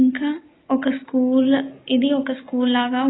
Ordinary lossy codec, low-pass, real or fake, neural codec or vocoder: AAC, 16 kbps; 7.2 kHz; real; none